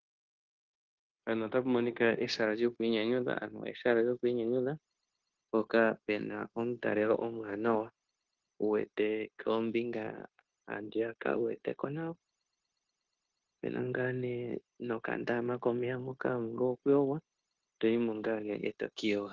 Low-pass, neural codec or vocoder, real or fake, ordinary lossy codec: 7.2 kHz; codec, 16 kHz, 0.9 kbps, LongCat-Audio-Codec; fake; Opus, 16 kbps